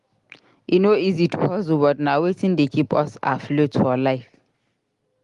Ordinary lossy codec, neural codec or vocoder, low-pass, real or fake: Opus, 24 kbps; none; 10.8 kHz; real